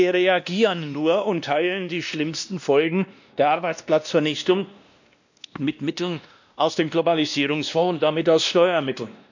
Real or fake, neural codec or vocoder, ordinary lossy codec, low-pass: fake; codec, 16 kHz, 1 kbps, X-Codec, WavLM features, trained on Multilingual LibriSpeech; none; 7.2 kHz